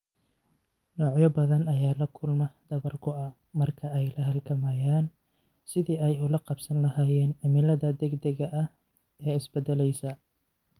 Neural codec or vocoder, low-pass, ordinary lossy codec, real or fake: none; 19.8 kHz; Opus, 32 kbps; real